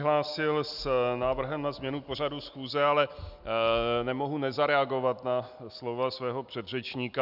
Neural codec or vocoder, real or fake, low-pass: none; real; 5.4 kHz